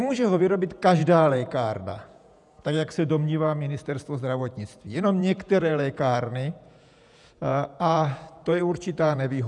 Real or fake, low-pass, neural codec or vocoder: fake; 10.8 kHz; vocoder, 48 kHz, 128 mel bands, Vocos